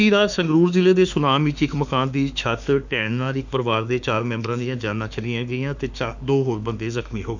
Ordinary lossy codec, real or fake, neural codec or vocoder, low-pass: none; fake; autoencoder, 48 kHz, 32 numbers a frame, DAC-VAE, trained on Japanese speech; 7.2 kHz